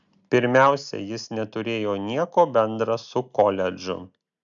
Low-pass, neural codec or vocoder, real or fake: 7.2 kHz; none; real